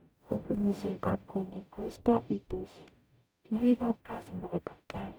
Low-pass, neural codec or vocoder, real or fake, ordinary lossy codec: none; codec, 44.1 kHz, 0.9 kbps, DAC; fake; none